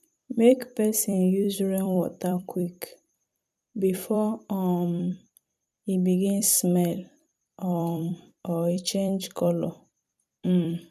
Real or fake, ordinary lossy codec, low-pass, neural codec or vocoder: fake; none; 14.4 kHz; vocoder, 44.1 kHz, 128 mel bands every 256 samples, BigVGAN v2